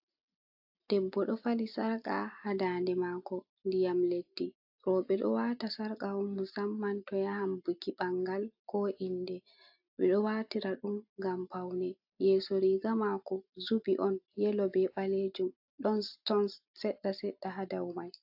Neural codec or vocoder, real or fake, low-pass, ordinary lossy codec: none; real; 5.4 kHz; AAC, 48 kbps